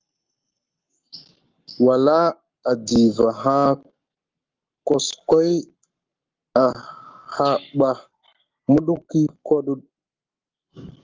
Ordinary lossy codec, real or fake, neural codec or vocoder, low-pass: Opus, 24 kbps; fake; codec, 44.1 kHz, 7.8 kbps, Pupu-Codec; 7.2 kHz